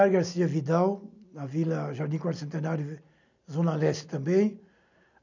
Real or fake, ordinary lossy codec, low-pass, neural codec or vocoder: real; none; 7.2 kHz; none